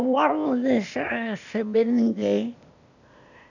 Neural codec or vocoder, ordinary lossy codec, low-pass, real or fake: codec, 16 kHz, 0.8 kbps, ZipCodec; none; 7.2 kHz; fake